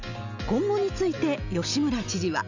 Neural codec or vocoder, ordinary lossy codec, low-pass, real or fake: none; none; 7.2 kHz; real